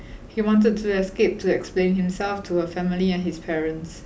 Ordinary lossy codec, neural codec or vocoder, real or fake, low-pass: none; none; real; none